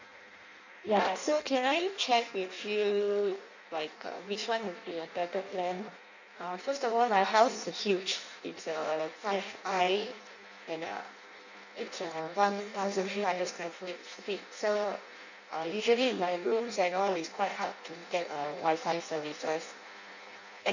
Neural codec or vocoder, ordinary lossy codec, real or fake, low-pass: codec, 16 kHz in and 24 kHz out, 0.6 kbps, FireRedTTS-2 codec; none; fake; 7.2 kHz